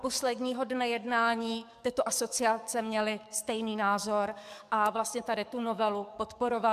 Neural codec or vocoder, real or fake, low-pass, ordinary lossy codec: codec, 44.1 kHz, 7.8 kbps, DAC; fake; 14.4 kHz; MP3, 96 kbps